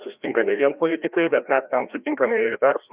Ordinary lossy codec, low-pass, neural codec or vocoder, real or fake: Opus, 64 kbps; 3.6 kHz; codec, 16 kHz, 1 kbps, FreqCodec, larger model; fake